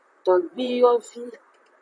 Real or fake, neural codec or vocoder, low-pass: fake; vocoder, 44.1 kHz, 128 mel bands, Pupu-Vocoder; 9.9 kHz